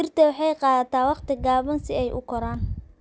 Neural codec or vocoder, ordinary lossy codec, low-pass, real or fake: none; none; none; real